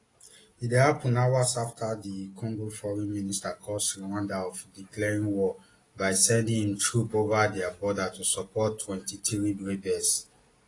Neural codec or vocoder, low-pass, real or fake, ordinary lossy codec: none; 10.8 kHz; real; AAC, 32 kbps